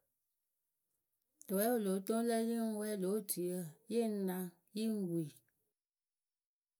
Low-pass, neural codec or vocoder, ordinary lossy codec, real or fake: none; none; none; real